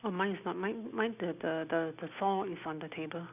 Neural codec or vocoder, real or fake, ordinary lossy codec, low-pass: none; real; none; 3.6 kHz